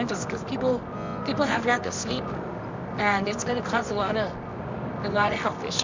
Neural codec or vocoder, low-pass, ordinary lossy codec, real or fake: codec, 24 kHz, 0.9 kbps, WavTokenizer, medium music audio release; 7.2 kHz; MP3, 64 kbps; fake